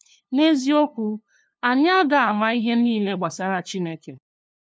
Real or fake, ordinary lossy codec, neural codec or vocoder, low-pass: fake; none; codec, 16 kHz, 2 kbps, FunCodec, trained on LibriTTS, 25 frames a second; none